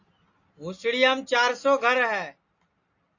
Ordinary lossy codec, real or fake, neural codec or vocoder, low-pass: AAC, 48 kbps; real; none; 7.2 kHz